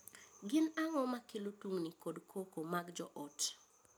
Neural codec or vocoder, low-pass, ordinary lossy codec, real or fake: none; none; none; real